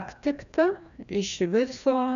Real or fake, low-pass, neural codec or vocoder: fake; 7.2 kHz; codec, 16 kHz, 1 kbps, FreqCodec, larger model